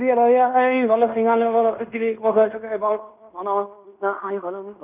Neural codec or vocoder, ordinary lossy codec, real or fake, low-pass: codec, 16 kHz in and 24 kHz out, 0.9 kbps, LongCat-Audio-Codec, fine tuned four codebook decoder; none; fake; 3.6 kHz